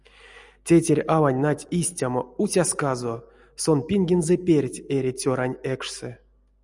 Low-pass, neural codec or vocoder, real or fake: 10.8 kHz; none; real